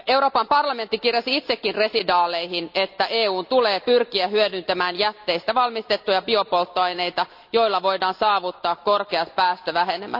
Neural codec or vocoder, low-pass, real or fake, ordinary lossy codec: none; 5.4 kHz; real; none